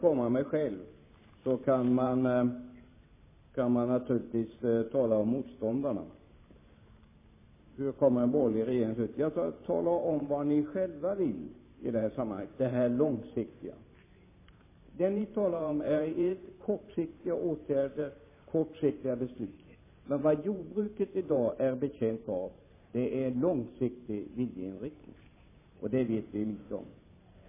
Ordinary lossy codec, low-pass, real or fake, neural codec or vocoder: MP3, 16 kbps; 3.6 kHz; fake; vocoder, 44.1 kHz, 128 mel bands every 512 samples, BigVGAN v2